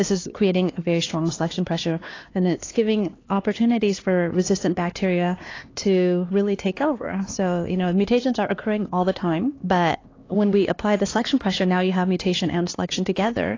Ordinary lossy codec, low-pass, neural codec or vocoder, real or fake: AAC, 32 kbps; 7.2 kHz; codec, 16 kHz, 2 kbps, X-Codec, HuBERT features, trained on LibriSpeech; fake